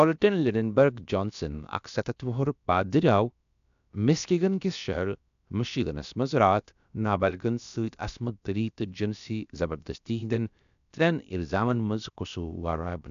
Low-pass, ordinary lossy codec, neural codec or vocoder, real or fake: 7.2 kHz; MP3, 96 kbps; codec, 16 kHz, about 1 kbps, DyCAST, with the encoder's durations; fake